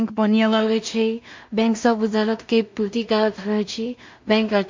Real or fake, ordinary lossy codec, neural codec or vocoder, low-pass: fake; MP3, 48 kbps; codec, 16 kHz in and 24 kHz out, 0.4 kbps, LongCat-Audio-Codec, two codebook decoder; 7.2 kHz